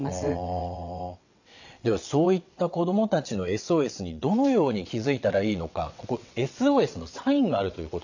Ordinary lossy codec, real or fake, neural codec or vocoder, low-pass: none; fake; vocoder, 22.05 kHz, 80 mel bands, WaveNeXt; 7.2 kHz